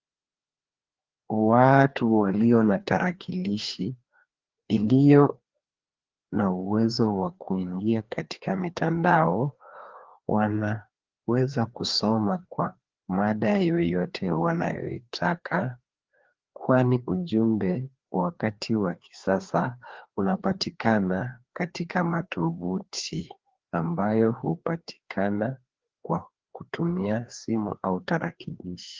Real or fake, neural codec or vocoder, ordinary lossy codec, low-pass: fake; codec, 16 kHz, 2 kbps, FreqCodec, larger model; Opus, 16 kbps; 7.2 kHz